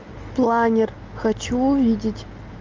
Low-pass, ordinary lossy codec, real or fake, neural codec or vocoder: 7.2 kHz; Opus, 32 kbps; real; none